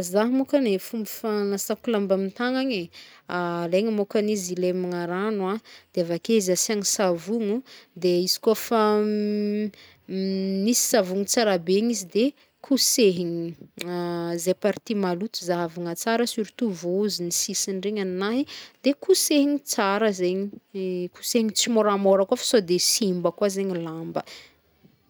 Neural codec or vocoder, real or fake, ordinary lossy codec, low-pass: none; real; none; none